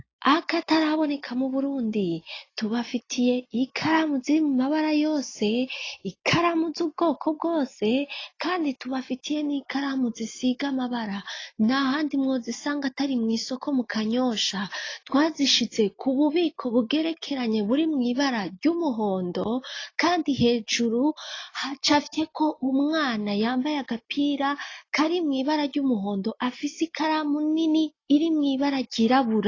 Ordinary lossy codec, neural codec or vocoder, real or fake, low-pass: AAC, 32 kbps; none; real; 7.2 kHz